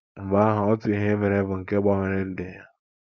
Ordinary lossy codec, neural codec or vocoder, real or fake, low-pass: none; codec, 16 kHz, 4.8 kbps, FACodec; fake; none